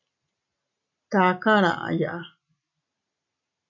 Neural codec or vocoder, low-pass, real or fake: none; 7.2 kHz; real